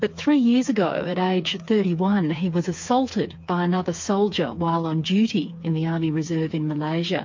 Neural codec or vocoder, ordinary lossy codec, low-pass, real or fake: codec, 16 kHz, 4 kbps, FreqCodec, smaller model; MP3, 48 kbps; 7.2 kHz; fake